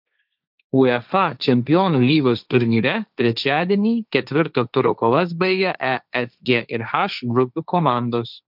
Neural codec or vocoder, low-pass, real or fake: codec, 16 kHz, 1.1 kbps, Voila-Tokenizer; 5.4 kHz; fake